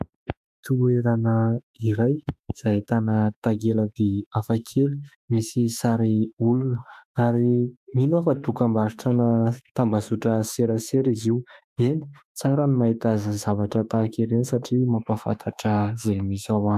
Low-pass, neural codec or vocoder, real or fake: 14.4 kHz; autoencoder, 48 kHz, 32 numbers a frame, DAC-VAE, trained on Japanese speech; fake